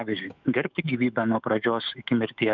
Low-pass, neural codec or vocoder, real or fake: 7.2 kHz; autoencoder, 48 kHz, 128 numbers a frame, DAC-VAE, trained on Japanese speech; fake